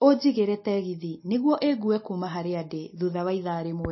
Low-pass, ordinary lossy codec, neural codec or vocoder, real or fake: 7.2 kHz; MP3, 24 kbps; none; real